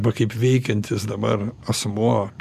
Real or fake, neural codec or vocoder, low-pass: real; none; 14.4 kHz